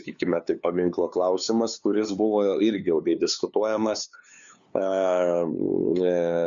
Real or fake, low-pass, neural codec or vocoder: fake; 7.2 kHz; codec, 16 kHz, 2 kbps, FunCodec, trained on LibriTTS, 25 frames a second